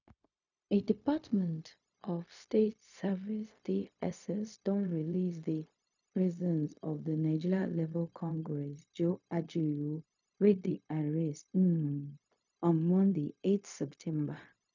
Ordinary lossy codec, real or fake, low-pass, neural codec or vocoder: none; fake; 7.2 kHz; codec, 16 kHz, 0.4 kbps, LongCat-Audio-Codec